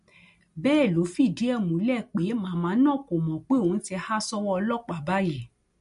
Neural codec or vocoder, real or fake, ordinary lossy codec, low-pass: none; real; MP3, 48 kbps; 10.8 kHz